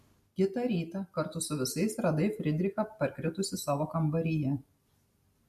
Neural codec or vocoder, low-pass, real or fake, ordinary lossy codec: none; 14.4 kHz; real; MP3, 64 kbps